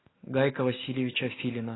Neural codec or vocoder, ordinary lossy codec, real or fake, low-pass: none; AAC, 16 kbps; real; 7.2 kHz